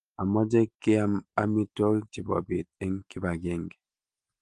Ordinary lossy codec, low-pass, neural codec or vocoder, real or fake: Opus, 24 kbps; 9.9 kHz; none; real